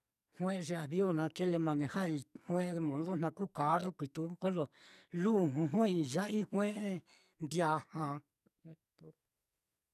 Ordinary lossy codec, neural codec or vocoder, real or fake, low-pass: none; codec, 44.1 kHz, 2.6 kbps, SNAC; fake; 14.4 kHz